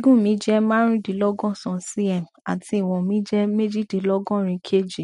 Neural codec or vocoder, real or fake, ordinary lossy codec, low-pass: none; real; MP3, 48 kbps; 19.8 kHz